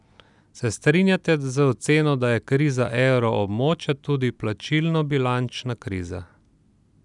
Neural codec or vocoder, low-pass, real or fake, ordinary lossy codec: none; 10.8 kHz; real; none